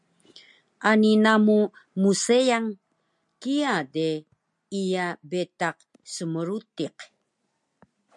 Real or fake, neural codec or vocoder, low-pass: real; none; 10.8 kHz